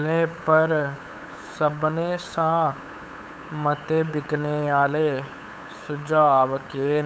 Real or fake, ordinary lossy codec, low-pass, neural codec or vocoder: fake; none; none; codec, 16 kHz, 8 kbps, FunCodec, trained on LibriTTS, 25 frames a second